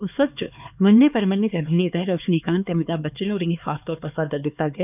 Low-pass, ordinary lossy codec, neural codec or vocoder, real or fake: 3.6 kHz; none; codec, 16 kHz, 4 kbps, X-Codec, HuBERT features, trained on LibriSpeech; fake